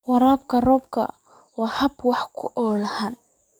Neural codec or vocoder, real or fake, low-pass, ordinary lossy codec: codec, 44.1 kHz, 7.8 kbps, DAC; fake; none; none